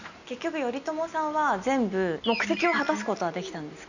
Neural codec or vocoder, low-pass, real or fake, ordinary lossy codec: none; 7.2 kHz; real; none